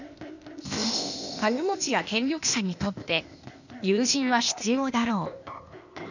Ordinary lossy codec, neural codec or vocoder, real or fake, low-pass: none; codec, 16 kHz, 0.8 kbps, ZipCodec; fake; 7.2 kHz